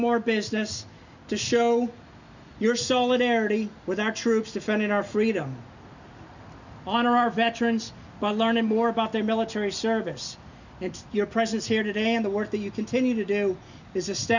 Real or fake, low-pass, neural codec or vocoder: real; 7.2 kHz; none